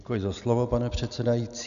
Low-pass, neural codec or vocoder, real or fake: 7.2 kHz; none; real